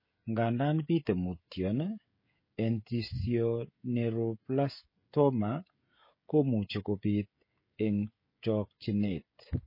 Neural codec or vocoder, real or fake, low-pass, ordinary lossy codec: codec, 16 kHz, 16 kbps, FreqCodec, smaller model; fake; 5.4 kHz; MP3, 24 kbps